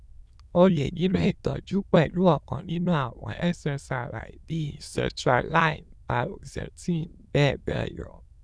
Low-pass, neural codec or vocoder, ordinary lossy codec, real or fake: none; autoencoder, 22.05 kHz, a latent of 192 numbers a frame, VITS, trained on many speakers; none; fake